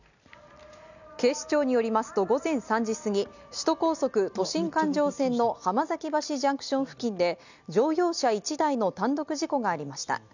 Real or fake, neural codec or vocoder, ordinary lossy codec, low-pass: real; none; none; 7.2 kHz